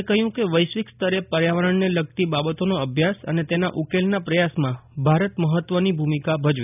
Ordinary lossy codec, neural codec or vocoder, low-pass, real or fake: none; none; 3.6 kHz; real